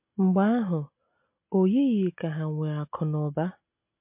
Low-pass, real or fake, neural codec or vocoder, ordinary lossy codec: 3.6 kHz; real; none; MP3, 32 kbps